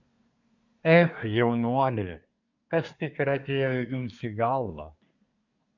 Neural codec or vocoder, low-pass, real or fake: codec, 24 kHz, 1 kbps, SNAC; 7.2 kHz; fake